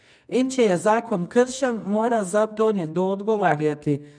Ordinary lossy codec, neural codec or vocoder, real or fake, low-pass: none; codec, 24 kHz, 0.9 kbps, WavTokenizer, medium music audio release; fake; 9.9 kHz